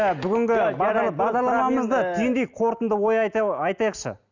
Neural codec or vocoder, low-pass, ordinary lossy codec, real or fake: none; 7.2 kHz; none; real